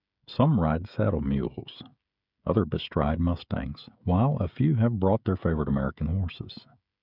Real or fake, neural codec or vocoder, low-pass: fake; codec, 16 kHz, 16 kbps, FreqCodec, smaller model; 5.4 kHz